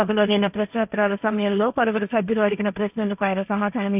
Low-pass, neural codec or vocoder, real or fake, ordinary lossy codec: 3.6 kHz; codec, 16 kHz, 1.1 kbps, Voila-Tokenizer; fake; none